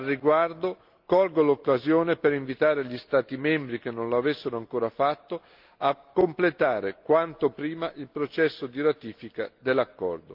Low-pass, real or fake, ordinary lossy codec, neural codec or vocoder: 5.4 kHz; real; Opus, 24 kbps; none